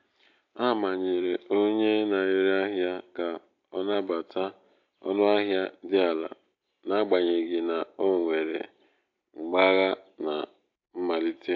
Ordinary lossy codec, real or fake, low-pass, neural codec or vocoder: AAC, 48 kbps; real; 7.2 kHz; none